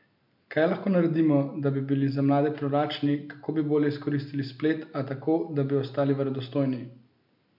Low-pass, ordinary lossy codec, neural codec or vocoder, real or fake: 5.4 kHz; MP3, 48 kbps; none; real